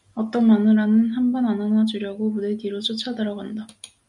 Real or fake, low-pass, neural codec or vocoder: real; 10.8 kHz; none